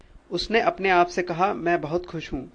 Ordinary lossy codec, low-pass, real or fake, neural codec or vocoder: AAC, 48 kbps; 9.9 kHz; real; none